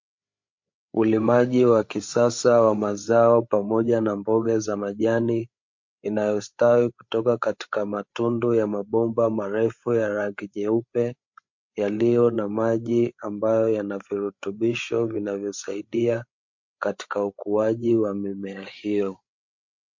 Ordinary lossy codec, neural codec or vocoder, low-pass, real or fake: MP3, 48 kbps; codec, 16 kHz, 8 kbps, FreqCodec, larger model; 7.2 kHz; fake